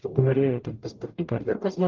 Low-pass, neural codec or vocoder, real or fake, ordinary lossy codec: 7.2 kHz; codec, 44.1 kHz, 0.9 kbps, DAC; fake; Opus, 24 kbps